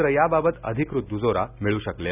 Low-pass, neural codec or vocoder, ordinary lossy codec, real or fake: 3.6 kHz; none; none; real